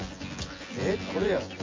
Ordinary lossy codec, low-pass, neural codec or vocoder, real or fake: MP3, 32 kbps; 7.2 kHz; vocoder, 24 kHz, 100 mel bands, Vocos; fake